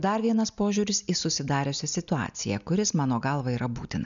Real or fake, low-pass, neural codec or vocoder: real; 7.2 kHz; none